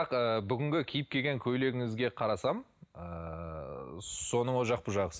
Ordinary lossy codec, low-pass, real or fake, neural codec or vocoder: none; none; real; none